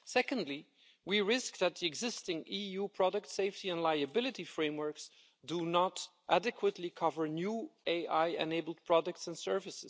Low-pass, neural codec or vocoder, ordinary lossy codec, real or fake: none; none; none; real